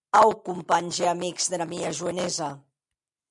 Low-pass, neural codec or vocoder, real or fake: 10.8 kHz; none; real